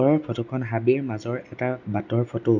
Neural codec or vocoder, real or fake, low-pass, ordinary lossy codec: none; real; 7.2 kHz; none